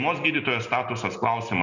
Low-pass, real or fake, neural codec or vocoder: 7.2 kHz; real; none